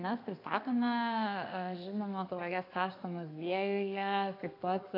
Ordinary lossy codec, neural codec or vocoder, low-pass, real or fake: AAC, 32 kbps; codec, 32 kHz, 1.9 kbps, SNAC; 5.4 kHz; fake